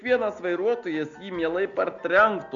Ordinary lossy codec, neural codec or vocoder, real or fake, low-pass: Opus, 64 kbps; none; real; 7.2 kHz